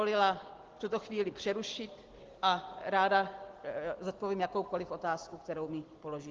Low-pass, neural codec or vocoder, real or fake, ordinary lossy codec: 7.2 kHz; none; real; Opus, 16 kbps